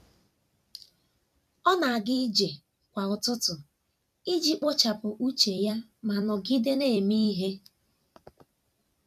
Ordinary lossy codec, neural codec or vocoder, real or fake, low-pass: none; vocoder, 44.1 kHz, 128 mel bands every 256 samples, BigVGAN v2; fake; 14.4 kHz